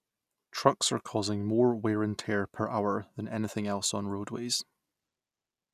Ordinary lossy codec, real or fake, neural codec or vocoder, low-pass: none; real; none; 14.4 kHz